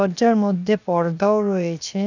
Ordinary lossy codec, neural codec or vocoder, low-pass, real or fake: none; codec, 16 kHz, 0.7 kbps, FocalCodec; 7.2 kHz; fake